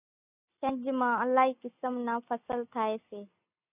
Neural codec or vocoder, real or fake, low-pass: none; real; 3.6 kHz